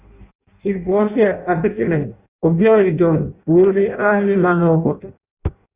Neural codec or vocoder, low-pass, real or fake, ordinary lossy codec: codec, 16 kHz in and 24 kHz out, 0.6 kbps, FireRedTTS-2 codec; 3.6 kHz; fake; Opus, 64 kbps